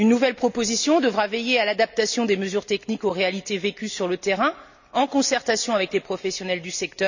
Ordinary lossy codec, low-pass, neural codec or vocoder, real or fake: none; 7.2 kHz; none; real